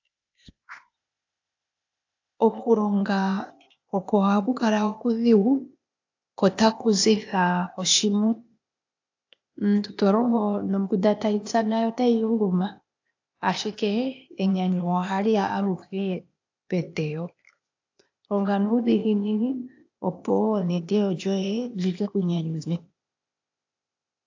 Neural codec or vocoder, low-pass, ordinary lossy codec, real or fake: codec, 16 kHz, 0.8 kbps, ZipCodec; 7.2 kHz; AAC, 48 kbps; fake